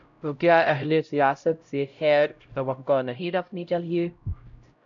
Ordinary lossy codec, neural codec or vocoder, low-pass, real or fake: MP3, 96 kbps; codec, 16 kHz, 0.5 kbps, X-Codec, HuBERT features, trained on LibriSpeech; 7.2 kHz; fake